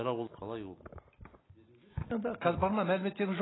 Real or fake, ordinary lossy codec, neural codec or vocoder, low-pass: real; AAC, 16 kbps; none; 7.2 kHz